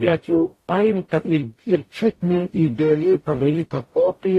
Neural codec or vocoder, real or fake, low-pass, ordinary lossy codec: codec, 44.1 kHz, 0.9 kbps, DAC; fake; 14.4 kHz; AAC, 48 kbps